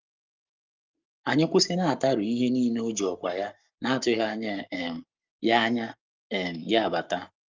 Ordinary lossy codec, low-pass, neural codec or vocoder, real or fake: Opus, 32 kbps; 7.2 kHz; codec, 44.1 kHz, 7.8 kbps, Pupu-Codec; fake